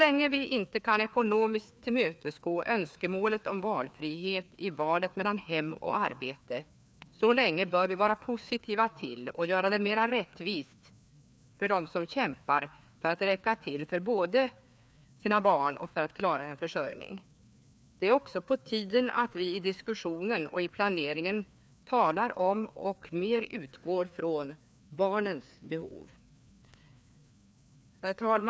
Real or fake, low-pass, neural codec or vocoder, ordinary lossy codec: fake; none; codec, 16 kHz, 2 kbps, FreqCodec, larger model; none